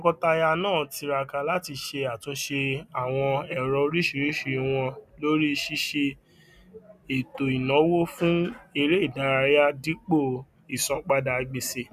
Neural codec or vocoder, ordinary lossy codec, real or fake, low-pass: none; none; real; 14.4 kHz